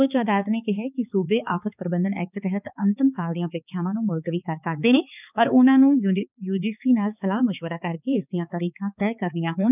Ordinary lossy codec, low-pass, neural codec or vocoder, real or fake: none; 3.6 kHz; codec, 16 kHz, 4 kbps, X-Codec, HuBERT features, trained on balanced general audio; fake